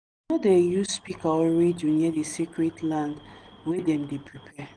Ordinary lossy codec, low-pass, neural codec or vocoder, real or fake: Opus, 24 kbps; 9.9 kHz; none; real